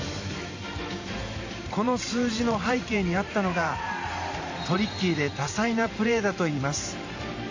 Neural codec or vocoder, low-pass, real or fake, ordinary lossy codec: vocoder, 44.1 kHz, 128 mel bands every 512 samples, BigVGAN v2; 7.2 kHz; fake; AAC, 48 kbps